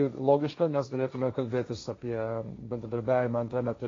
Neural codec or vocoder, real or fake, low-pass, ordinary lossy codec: codec, 16 kHz, 1.1 kbps, Voila-Tokenizer; fake; 7.2 kHz; AAC, 32 kbps